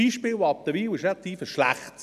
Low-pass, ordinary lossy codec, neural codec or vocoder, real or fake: 14.4 kHz; none; none; real